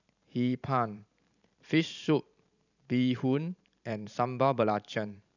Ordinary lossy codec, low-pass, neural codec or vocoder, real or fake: none; 7.2 kHz; vocoder, 44.1 kHz, 128 mel bands every 512 samples, BigVGAN v2; fake